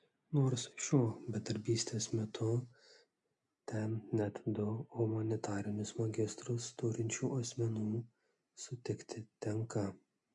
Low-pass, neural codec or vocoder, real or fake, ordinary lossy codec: 10.8 kHz; none; real; MP3, 64 kbps